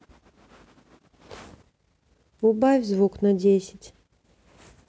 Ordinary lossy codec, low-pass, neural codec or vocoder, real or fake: none; none; none; real